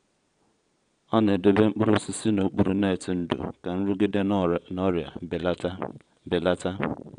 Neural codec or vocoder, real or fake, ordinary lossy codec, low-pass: vocoder, 22.05 kHz, 80 mel bands, Vocos; fake; none; 9.9 kHz